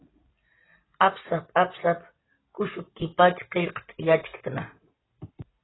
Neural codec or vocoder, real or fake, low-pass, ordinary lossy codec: none; real; 7.2 kHz; AAC, 16 kbps